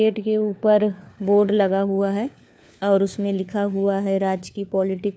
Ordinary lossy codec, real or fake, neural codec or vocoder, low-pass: none; fake; codec, 16 kHz, 4 kbps, FunCodec, trained on LibriTTS, 50 frames a second; none